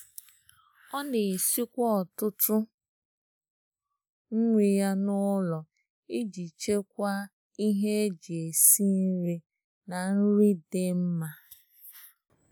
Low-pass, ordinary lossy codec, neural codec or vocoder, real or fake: none; none; none; real